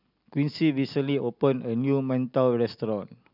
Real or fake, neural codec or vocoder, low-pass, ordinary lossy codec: real; none; 5.4 kHz; none